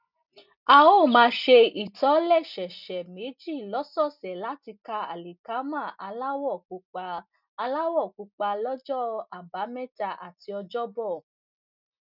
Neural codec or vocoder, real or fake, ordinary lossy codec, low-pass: none; real; none; 5.4 kHz